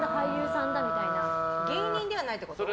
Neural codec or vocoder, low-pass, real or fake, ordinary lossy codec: none; none; real; none